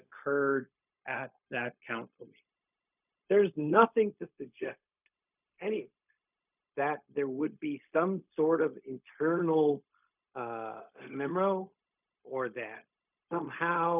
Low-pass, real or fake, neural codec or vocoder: 3.6 kHz; fake; codec, 16 kHz, 0.4 kbps, LongCat-Audio-Codec